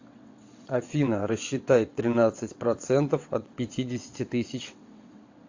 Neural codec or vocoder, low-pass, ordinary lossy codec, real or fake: vocoder, 22.05 kHz, 80 mel bands, WaveNeXt; 7.2 kHz; AAC, 48 kbps; fake